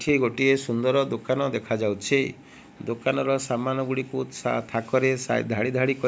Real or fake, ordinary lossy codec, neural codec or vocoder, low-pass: real; none; none; none